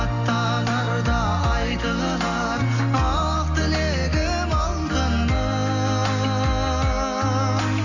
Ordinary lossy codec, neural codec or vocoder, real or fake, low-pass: none; none; real; 7.2 kHz